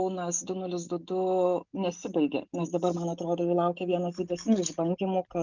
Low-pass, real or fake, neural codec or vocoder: 7.2 kHz; real; none